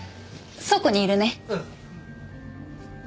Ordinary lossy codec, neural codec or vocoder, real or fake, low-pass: none; none; real; none